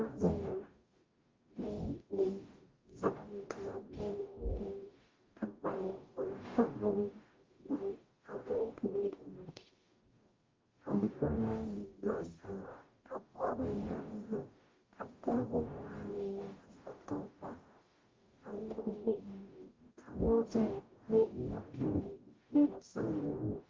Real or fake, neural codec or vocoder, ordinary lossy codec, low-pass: fake; codec, 44.1 kHz, 0.9 kbps, DAC; Opus, 32 kbps; 7.2 kHz